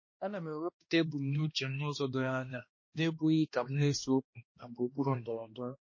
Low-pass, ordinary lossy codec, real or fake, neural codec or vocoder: 7.2 kHz; MP3, 32 kbps; fake; codec, 16 kHz, 1 kbps, X-Codec, HuBERT features, trained on balanced general audio